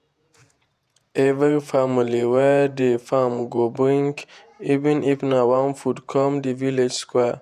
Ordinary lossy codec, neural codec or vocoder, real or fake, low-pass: none; vocoder, 48 kHz, 128 mel bands, Vocos; fake; 14.4 kHz